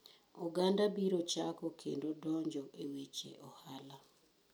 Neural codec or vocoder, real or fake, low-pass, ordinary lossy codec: none; real; none; none